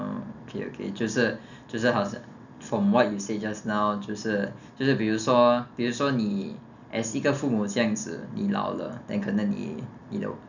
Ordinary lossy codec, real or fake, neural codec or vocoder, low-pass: none; real; none; 7.2 kHz